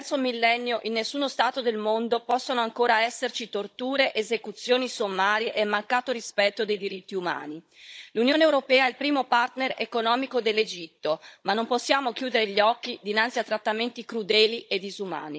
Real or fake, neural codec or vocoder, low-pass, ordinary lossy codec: fake; codec, 16 kHz, 16 kbps, FunCodec, trained on Chinese and English, 50 frames a second; none; none